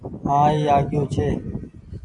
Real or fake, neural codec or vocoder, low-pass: real; none; 10.8 kHz